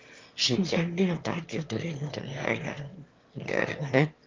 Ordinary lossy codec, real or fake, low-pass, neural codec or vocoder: Opus, 32 kbps; fake; 7.2 kHz; autoencoder, 22.05 kHz, a latent of 192 numbers a frame, VITS, trained on one speaker